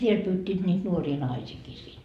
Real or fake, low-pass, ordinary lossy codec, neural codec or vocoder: fake; 14.4 kHz; none; vocoder, 44.1 kHz, 128 mel bands every 256 samples, BigVGAN v2